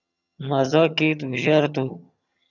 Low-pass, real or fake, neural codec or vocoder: 7.2 kHz; fake; vocoder, 22.05 kHz, 80 mel bands, HiFi-GAN